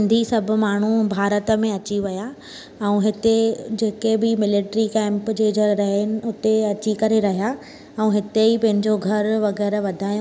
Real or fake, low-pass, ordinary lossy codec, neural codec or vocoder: real; none; none; none